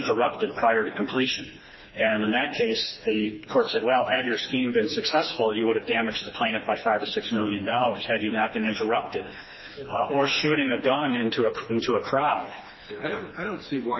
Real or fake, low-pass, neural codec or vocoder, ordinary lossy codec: fake; 7.2 kHz; codec, 16 kHz, 2 kbps, FreqCodec, smaller model; MP3, 24 kbps